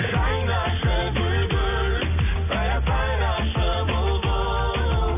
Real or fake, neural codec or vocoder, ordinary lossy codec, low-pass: real; none; none; 3.6 kHz